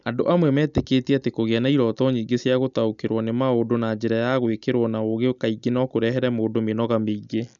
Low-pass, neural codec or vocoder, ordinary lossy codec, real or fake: 7.2 kHz; none; none; real